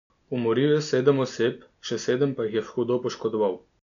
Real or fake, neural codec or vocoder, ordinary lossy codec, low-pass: real; none; none; 7.2 kHz